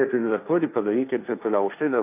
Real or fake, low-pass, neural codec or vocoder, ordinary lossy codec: fake; 3.6 kHz; codec, 16 kHz, 1.1 kbps, Voila-Tokenizer; AAC, 24 kbps